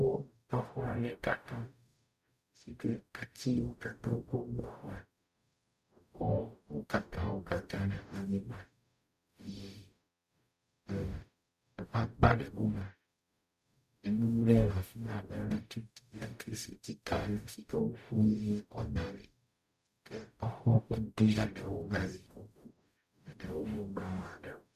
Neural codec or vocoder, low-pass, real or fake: codec, 44.1 kHz, 0.9 kbps, DAC; 14.4 kHz; fake